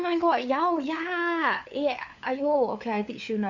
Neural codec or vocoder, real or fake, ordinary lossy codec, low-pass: codec, 16 kHz, 4 kbps, FunCodec, trained on LibriTTS, 50 frames a second; fake; none; 7.2 kHz